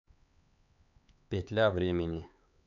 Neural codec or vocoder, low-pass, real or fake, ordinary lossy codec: codec, 16 kHz, 4 kbps, X-Codec, HuBERT features, trained on LibriSpeech; 7.2 kHz; fake; none